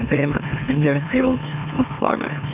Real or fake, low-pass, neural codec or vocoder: fake; 3.6 kHz; autoencoder, 44.1 kHz, a latent of 192 numbers a frame, MeloTTS